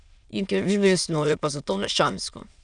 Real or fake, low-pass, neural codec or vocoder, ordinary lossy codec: fake; 9.9 kHz; autoencoder, 22.05 kHz, a latent of 192 numbers a frame, VITS, trained on many speakers; none